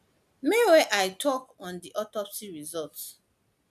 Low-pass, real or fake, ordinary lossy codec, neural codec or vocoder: 14.4 kHz; real; none; none